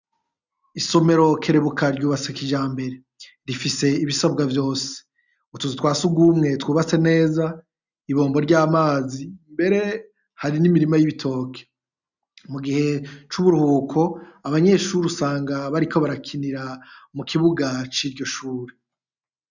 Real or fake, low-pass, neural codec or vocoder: real; 7.2 kHz; none